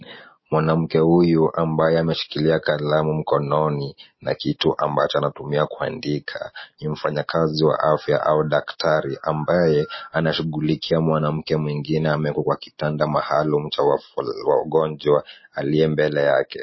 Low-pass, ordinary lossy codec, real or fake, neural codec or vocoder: 7.2 kHz; MP3, 24 kbps; real; none